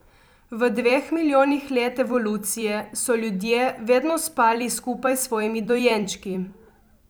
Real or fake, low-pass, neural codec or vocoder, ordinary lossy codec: fake; none; vocoder, 44.1 kHz, 128 mel bands every 256 samples, BigVGAN v2; none